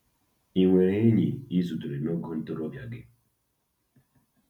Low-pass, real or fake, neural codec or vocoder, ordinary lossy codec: 19.8 kHz; real; none; none